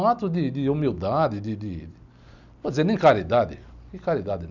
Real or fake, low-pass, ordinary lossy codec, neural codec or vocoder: real; 7.2 kHz; none; none